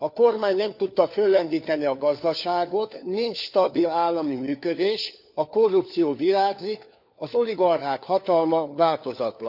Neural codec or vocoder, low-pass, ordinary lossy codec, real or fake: codec, 16 kHz, 4 kbps, FunCodec, trained on LibriTTS, 50 frames a second; 5.4 kHz; none; fake